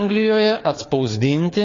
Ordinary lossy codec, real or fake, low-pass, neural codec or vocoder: AAC, 32 kbps; fake; 7.2 kHz; codec, 16 kHz, 4 kbps, FreqCodec, larger model